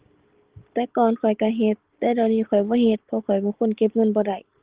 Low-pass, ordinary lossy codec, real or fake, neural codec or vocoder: 3.6 kHz; Opus, 16 kbps; real; none